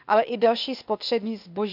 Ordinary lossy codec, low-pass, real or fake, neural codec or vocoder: none; 5.4 kHz; fake; codec, 16 kHz, 0.8 kbps, ZipCodec